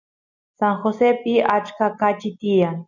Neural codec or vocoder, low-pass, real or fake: none; 7.2 kHz; real